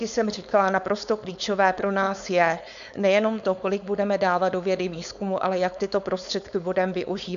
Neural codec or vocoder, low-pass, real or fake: codec, 16 kHz, 4.8 kbps, FACodec; 7.2 kHz; fake